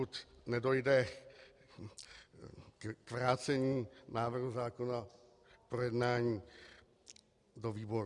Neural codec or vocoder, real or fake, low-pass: none; real; 10.8 kHz